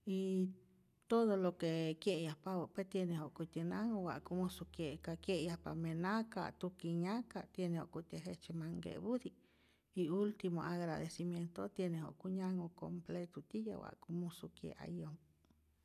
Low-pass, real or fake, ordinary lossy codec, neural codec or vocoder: 14.4 kHz; real; none; none